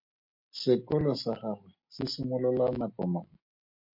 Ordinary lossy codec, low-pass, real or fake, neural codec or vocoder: MP3, 32 kbps; 5.4 kHz; real; none